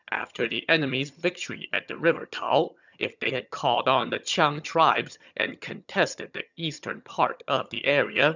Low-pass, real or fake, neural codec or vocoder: 7.2 kHz; fake; vocoder, 22.05 kHz, 80 mel bands, HiFi-GAN